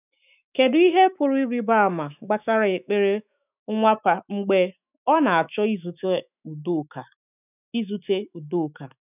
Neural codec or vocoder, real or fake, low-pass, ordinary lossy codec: autoencoder, 48 kHz, 128 numbers a frame, DAC-VAE, trained on Japanese speech; fake; 3.6 kHz; none